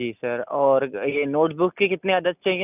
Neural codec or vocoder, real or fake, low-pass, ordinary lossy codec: none; real; 3.6 kHz; none